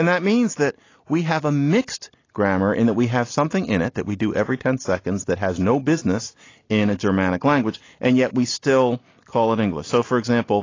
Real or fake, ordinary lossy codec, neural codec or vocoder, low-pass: real; AAC, 32 kbps; none; 7.2 kHz